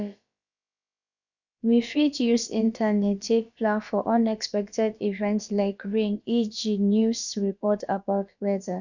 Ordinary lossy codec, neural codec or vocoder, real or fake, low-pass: none; codec, 16 kHz, about 1 kbps, DyCAST, with the encoder's durations; fake; 7.2 kHz